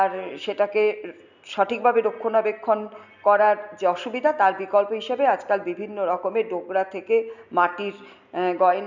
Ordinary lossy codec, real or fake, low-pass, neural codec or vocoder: none; real; 7.2 kHz; none